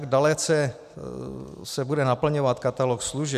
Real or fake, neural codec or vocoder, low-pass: real; none; 14.4 kHz